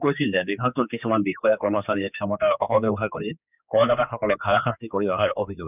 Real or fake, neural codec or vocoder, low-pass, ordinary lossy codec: fake; codec, 16 kHz, 2 kbps, X-Codec, HuBERT features, trained on general audio; 3.6 kHz; none